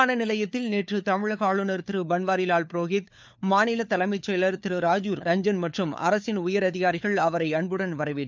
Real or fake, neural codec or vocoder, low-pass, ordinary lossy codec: fake; codec, 16 kHz, 4 kbps, FunCodec, trained on LibriTTS, 50 frames a second; none; none